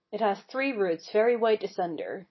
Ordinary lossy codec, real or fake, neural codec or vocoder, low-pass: MP3, 24 kbps; real; none; 7.2 kHz